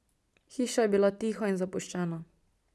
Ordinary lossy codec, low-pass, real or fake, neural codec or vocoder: none; none; real; none